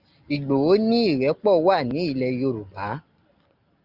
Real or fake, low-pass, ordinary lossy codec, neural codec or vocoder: real; 5.4 kHz; Opus, 24 kbps; none